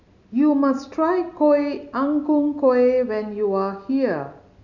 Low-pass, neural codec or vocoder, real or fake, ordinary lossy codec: 7.2 kHz; none; real; none